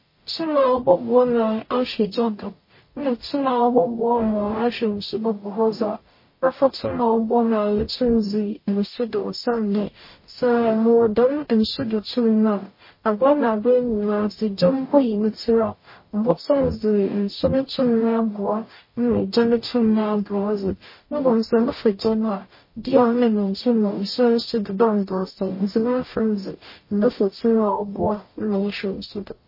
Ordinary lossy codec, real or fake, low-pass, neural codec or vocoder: MP3, 24 kbps; fake; 5.4 kHz; codec, 44.1 kHz, 0.9 kbps, DAC